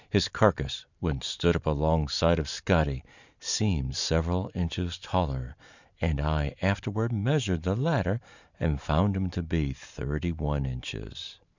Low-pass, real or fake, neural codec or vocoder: 7.2 kHz; fake; vocoder, 44.1 kHz, 128 mel bands every 512 samples, BigVGAN v2